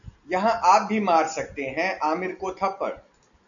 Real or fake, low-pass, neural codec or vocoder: real; 7.2 kHz; none